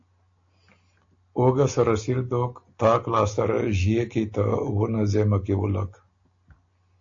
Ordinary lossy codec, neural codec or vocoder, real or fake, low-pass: AAC, 48 kbps; none; real; 7.2 kHz